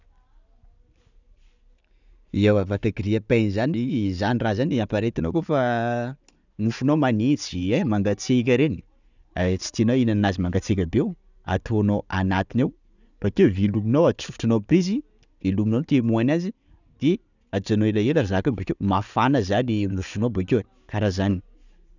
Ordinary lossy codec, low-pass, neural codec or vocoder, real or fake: none; 7.2 kHz; none; real